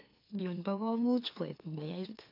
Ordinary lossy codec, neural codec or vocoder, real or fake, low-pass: AAC, 32 kbps; autoencoder, 44.1 kHz, a latent of 192 numbers a frame, MeloTTS; fake; 5.4 kHz